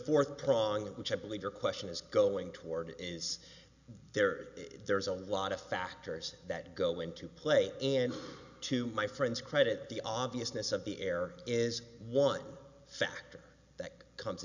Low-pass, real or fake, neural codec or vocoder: 7.2 kHz; real; none